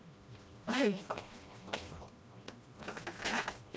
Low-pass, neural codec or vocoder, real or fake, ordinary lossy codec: none; codec, 16 kHz, 1 kbps, FreqCodec, smaller model; fake; none